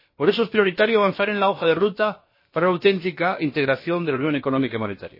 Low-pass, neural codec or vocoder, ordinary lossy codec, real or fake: 5.4 kHz; codec, 16 kHz, about 1 kbps, DyCAST, with the encoder's durations; MP3, 24 kbps; fake